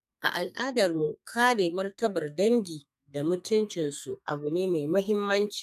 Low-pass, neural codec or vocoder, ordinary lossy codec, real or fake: 14.4 kHz; codec, 32 kHz, 1.9 kbps, SNAC; none; fake